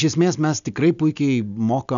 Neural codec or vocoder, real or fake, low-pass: none; real; 7.2 kHz